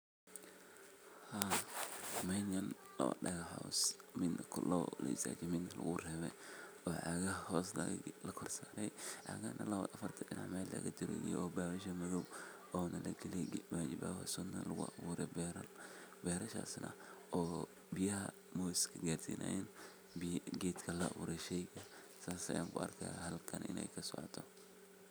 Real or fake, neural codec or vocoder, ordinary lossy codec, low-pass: real; none; none; none